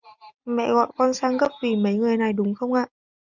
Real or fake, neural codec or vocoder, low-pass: real; none; 7.2 kHz